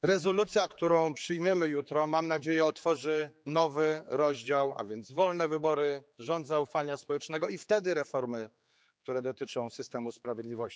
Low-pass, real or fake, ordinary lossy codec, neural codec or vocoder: none; fake; none; codec, 16 kHz, 4 kbps, X-Codec, HuBERT features, trained on general audio